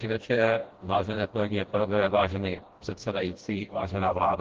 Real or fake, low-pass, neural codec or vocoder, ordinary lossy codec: fake; 7.2 kHz; codec, 16 kHz, 1 kbps, FreqCodec, smaller model; Opus, 16 kbps